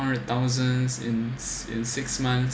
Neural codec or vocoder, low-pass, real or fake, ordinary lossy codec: none; none; real; none